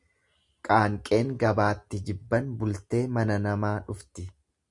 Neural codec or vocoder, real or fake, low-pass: none; real; 10.8 kHz